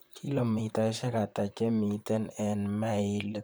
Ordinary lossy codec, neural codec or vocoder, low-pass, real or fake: none; vocoder, 44.1 kHz, 128 mel bands, Pupu-Vocoder; none; fake